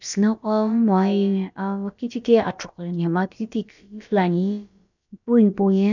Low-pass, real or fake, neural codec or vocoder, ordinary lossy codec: 7.2 kHz; fake; codec, 16 kHz, about 1 kbps, DyCAST, with the encoder's durations; none